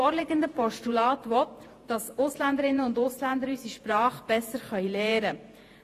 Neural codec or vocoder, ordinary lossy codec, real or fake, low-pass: vocoder, 48 kHz, 128 mel bands, Vocos; AAC, 48 kbps; fake; 14.4 kHz